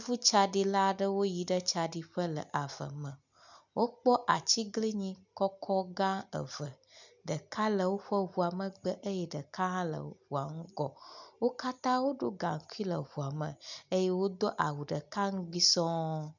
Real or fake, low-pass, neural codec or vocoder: real; 7.2 kHz; none